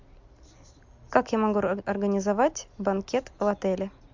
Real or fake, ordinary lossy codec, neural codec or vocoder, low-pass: real; MP3, 64 kbps; none; 7.2 kHz